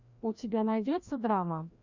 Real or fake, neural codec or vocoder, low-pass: fake; codec, 16 kHz, 1 kbps, FreqCodec, larger model; 7.2 kHz